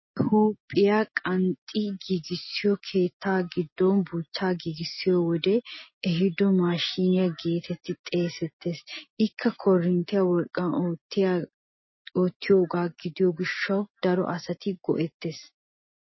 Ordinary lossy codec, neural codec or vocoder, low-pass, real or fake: MP3, 24 kbps; none; 7.2 kHz; real